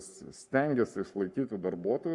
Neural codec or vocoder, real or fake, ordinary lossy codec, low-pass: codec, 44.1 kHz, 7.8 kbps, Pupu-Codec; fake; Opus, 64 kbps; 10.8 kHz